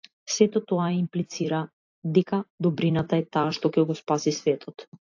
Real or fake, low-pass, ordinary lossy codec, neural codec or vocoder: fake; 7.2 kHz; AAC, 48 kbps; vocoder, 44.1 kHz, 128 mel bands every 256 samples, BigVGAN v2